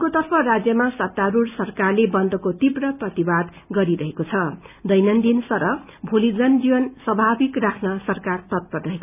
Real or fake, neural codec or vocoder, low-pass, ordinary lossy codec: real; none; 3.6 kHz; none